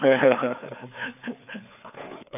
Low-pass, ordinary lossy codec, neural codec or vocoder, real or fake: 3.6 kHz; none; codec, 16 kHz, 4 kbps, FreqCodec, larger model; fake